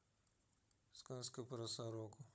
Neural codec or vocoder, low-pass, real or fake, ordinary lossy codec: codec, 16 kHz, 16 kbps, FunCodec, trained on Chinese and English, 50 frames a second; none; fake; none